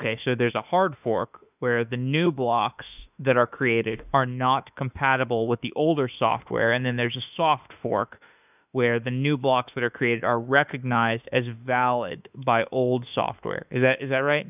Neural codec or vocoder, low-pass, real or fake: autoencoder, 48 kHz, 32 numbers a frame, DAC-VAE, trained on Japanese speech; 3.6 kHz; fake